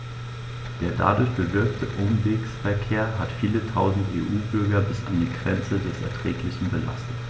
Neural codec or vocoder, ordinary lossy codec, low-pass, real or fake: none; none; none; real